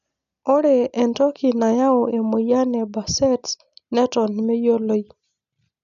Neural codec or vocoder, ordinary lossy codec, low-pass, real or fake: none; none; 7.2 kHz; real